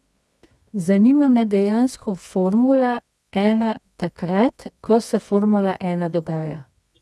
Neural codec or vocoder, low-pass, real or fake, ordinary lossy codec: codec, 24 kHz, 0.9 kbps, WavTokenizer, medium music audio release; none; fake; none